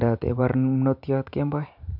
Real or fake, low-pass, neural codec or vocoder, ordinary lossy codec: fake; 5.4 kHz; vocoder, 44.1 kHz, 128 mel bands every 512 samples, BigVGAN v2; none